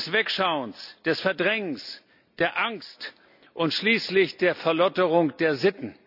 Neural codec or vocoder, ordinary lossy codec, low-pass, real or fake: none; none; 5.4 kHz; real